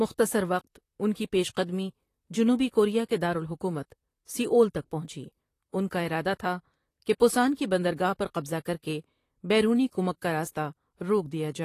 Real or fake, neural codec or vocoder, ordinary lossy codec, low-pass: fake; vocoder, 44.1 kHz, 128 mel bands, Pupu-Vocoder; AAC, 48 kbps; 14.4 kHz